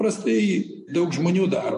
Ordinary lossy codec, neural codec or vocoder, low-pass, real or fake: MP3, 48 kbps; none; 10.8 kHz; real